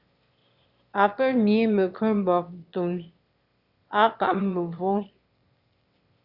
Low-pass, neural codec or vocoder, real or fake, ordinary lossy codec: 5.4 kHz; autoencoder, 22.05 kHz, a latent of 192 numbers a frame, VITS, trained on one speaker; fake; Opus, 64 kbps